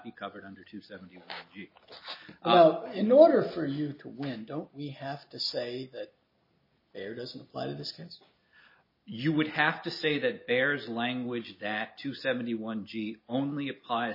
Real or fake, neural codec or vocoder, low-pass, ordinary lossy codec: real; none; 5.4 kHz; MP3, 24 kbps